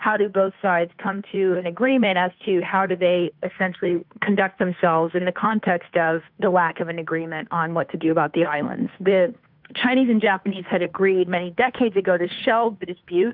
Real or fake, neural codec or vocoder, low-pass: fake; codec, 16 kHz, 2 kbps, FunCodec, trained on Chinese and English, 25 frames a second; 5.4 kHz